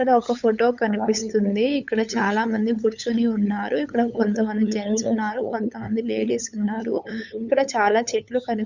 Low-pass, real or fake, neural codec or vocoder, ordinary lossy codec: 7.2 kHz; fake; codec, 16 kHz, 8 kbps, FunCodec, trained on LibriTTS, 25 frames a second; none